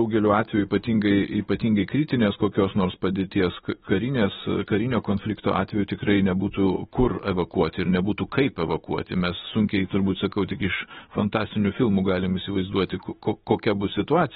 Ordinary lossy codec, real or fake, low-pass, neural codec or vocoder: AAC, 16 kbps; fake; 19.8 kHz; autoencoder, 48 kHz, 128 numbers a frame, DAC-VAE, trained on Japanese speech